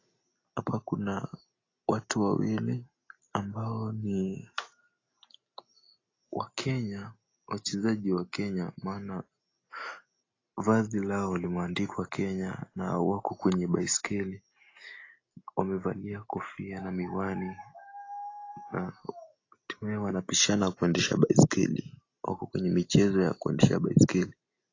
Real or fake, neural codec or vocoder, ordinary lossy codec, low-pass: real; none; AAC, 32 kbps; 7.2 kHz